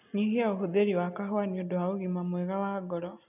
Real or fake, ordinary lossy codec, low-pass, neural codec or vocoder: real; none; 3.6 kHz; none